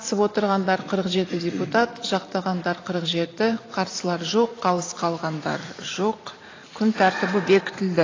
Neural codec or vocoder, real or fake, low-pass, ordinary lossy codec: vocoder, 44.1 kHz, 128 mel bands every 512 samples, BigVGAN v2; fake; 7.2 kHz; AAC, 32 kbps